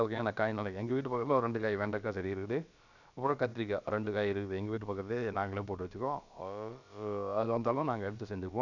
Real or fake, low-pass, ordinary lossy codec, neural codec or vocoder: fake; 7.2 kHz; none; codec, 16 kHz, about 1 kbps, DyCAST, with the encoder's durations